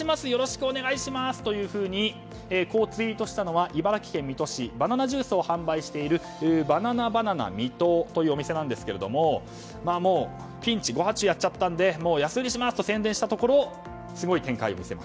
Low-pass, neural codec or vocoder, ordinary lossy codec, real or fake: none; none; none; real